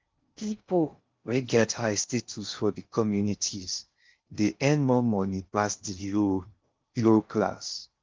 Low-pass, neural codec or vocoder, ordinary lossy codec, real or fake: 7.2 kHz; codec, 16 kHz in and 24 kHz out, 0.6 kbps, FocalCodec, streaming, 2048 codes; Opus, 24 kbps; fake